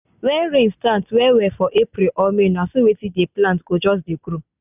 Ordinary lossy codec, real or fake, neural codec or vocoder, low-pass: none; real; none; 3.6 kHz